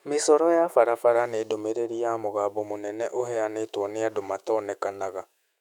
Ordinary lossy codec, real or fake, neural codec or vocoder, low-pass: none; fake; autoencoder, 48 kHz, 128 numbers a frame, DAC-VAE, trained on Japanese speech; 19.8 kHz